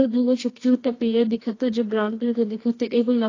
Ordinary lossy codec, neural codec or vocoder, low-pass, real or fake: AAC, 32 kbps; codec, 24 kHz, 0.9 kbps, WavTokenizer, medium music audio release; 7.2 kHz; fake